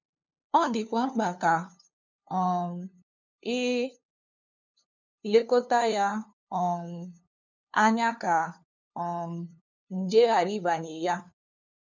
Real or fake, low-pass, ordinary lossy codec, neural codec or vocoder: fake; 7.2 kHz; none; codec, 16 kHz, 2 kbps, FunCodec, trained on LibriTTS, 25 frames a second